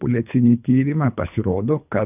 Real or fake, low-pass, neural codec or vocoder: fake; 3.6 kHz; codec, 24 kHz, 3 kbps, HILCodec